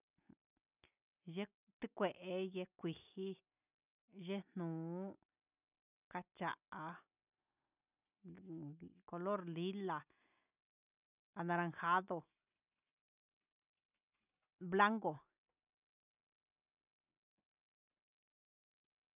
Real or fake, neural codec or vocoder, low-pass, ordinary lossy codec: real; none; 3.6 kHz; none